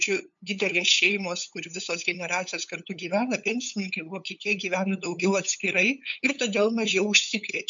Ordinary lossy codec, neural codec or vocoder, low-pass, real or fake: MP3, 64 kbps; codec, 16 kHz, 8 kbps, FunCodec, trained on LibriTTS, 25 frames a second; 7.2 kHz; fake